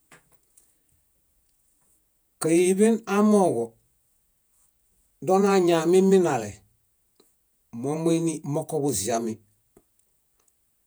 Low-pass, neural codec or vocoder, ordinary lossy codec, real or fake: none; vocoder, 48 kHz, 128 mel bands, Vocos; none; fake